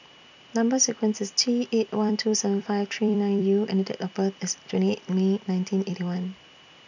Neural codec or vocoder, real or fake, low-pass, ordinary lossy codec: vocoder, 44.1 kHz, 128 mel bands every 256 samples, BigVGAN v2; fake; 7.2 kHz; none